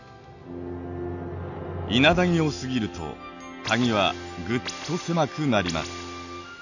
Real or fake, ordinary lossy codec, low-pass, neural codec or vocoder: real; none; 7.2 kHz; none